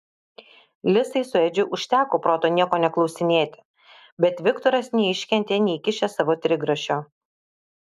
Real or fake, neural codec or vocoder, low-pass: real; none; 14.4 kHz